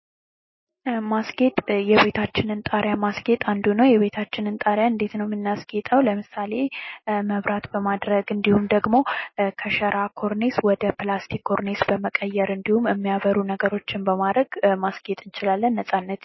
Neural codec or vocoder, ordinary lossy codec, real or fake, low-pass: none; MP3, 24 kbps; real; 7.2 kHz